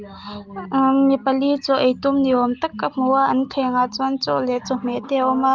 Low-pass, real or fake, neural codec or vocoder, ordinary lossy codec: 7.2 kHz; real; none; Opus, 32 kbps